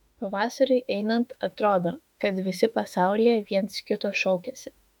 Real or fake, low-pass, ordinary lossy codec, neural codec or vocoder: fake; 19.8 kHz; MP3, 96 kbps; autoencoder, 48 kHz, 32 numbers a frame, DAC-VAE, trained on Japanese speech